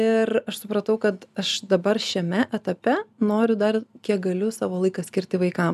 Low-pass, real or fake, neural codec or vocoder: 14.4 kHz; real; none